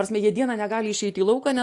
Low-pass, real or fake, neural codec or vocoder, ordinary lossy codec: 10.8 kHz; real; none; AAC, 64 kbps